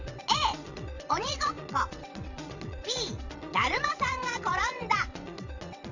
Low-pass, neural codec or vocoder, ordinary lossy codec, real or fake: 7.2 kHz; vocoder, 22.05 kHz, 80 mel bands, WaveNeXt; none; fake